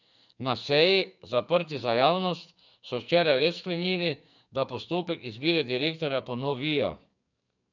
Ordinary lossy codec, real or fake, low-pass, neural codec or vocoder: none; fake; 7.2 kHz; codec, 44.1 kHz, 2.6 kbps, SNAC